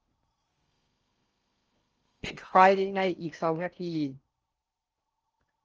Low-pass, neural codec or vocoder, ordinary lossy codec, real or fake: 7.2 kHz; codec, 16 kHz in and 24 kHz out, 0.6 kbps, FocalCodec, streaming, 4096 codes; Opus, 24 kbps; fake